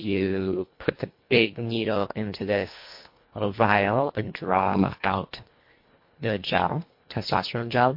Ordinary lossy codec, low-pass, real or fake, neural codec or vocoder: MP3, 32 kbps; 5.4 kHz; fake; codec, 24 kHz, 1.5 kbps, HILCodec